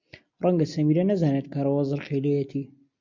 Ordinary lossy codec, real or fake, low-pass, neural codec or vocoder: MP3, 64 kbps; real; 7.2 kHz; none